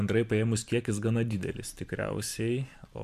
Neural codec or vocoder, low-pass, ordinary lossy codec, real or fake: vocoder, 44.1 kHz, 128 mel bands every 512 samples, BigVGAN v2; 14.4 kHz; MP3, 96 kbps; fake